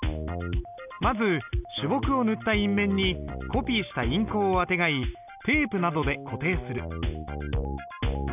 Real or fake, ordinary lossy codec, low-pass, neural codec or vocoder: real; none; 3.6 kHz; none